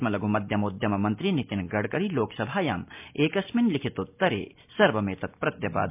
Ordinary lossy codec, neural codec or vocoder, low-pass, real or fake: MP3, 32 kbps; none; 3.6 kHz; real